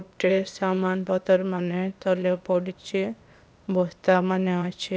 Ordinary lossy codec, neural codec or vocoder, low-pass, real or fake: none; codec, 16 kHz, 0.8 kbps, ZipCodec; none; fake